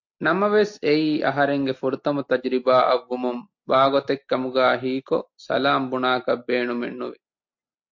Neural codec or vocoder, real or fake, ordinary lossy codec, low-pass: none; real; MP3, 48 kbps; 7.2 kHz